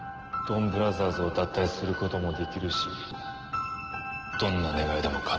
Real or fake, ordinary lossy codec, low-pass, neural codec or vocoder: real; Opus, 16 kbps; 7.2 kHz; none